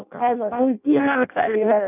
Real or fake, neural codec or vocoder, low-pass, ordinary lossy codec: fake; codec, 16 kHz in and 24 kHz out, 0.6 kbps, FireRedTTS-2 codec; 3.6 kHz; none